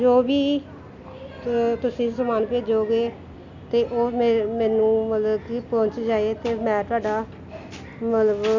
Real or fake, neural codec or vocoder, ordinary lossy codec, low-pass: real; none; none; 7.2 kHz